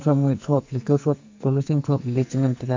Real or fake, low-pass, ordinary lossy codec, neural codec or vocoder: fake; 7.2 kHz; MP3, 64 kbps; codec, 44.1 kHz, 2.6 kbps, SNAC